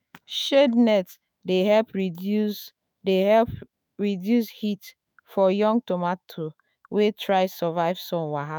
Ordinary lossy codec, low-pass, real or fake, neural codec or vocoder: none; none; fake; autoencoder, 48 kHz, 128 numbers a frame, DAC-VAE, trained on Japanese speech